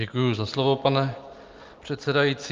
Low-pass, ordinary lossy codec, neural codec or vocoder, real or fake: 7.2 kHz; Opus, 32 kbps; none; real